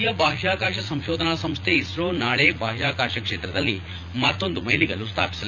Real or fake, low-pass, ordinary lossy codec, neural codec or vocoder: fake; 7.2 kHz; none; vocoder, 44.1 kHz, 80 mel bands, Vocos